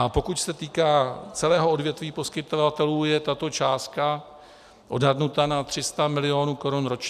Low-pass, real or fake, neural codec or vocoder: 14.4 kHz; real; none